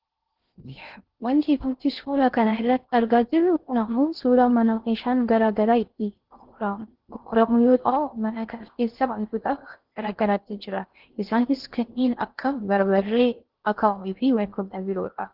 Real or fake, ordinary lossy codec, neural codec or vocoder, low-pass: fake; Opus, 16 kbps; codec, 16 kHz in and 24 kHz out, 0.6 kbps, FocalCodec, streaming, 2048 codes; 5.4 kHz